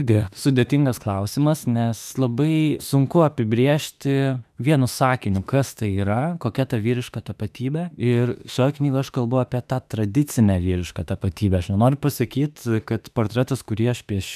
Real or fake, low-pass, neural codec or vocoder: fake; 14.4 kHz; autoencoder, 48 kHz, 32 numbers a frame, DAC-VAE, trained on Japanese speech